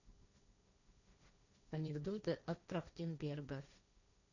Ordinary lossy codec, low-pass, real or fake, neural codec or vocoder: none; 7.2 kHz; fake; codec, 16 kHz, 1.1 kbps, Voila-Tokenizer